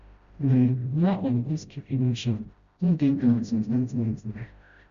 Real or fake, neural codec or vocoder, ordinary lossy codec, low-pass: fake; codec, 16 kHz, 0.5 kbps, FreqCodec, smaller model; Opus, 64 kbps; 7.2 kHz